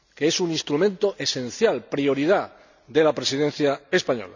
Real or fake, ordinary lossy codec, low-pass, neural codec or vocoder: real; none; 7.2 kHz; none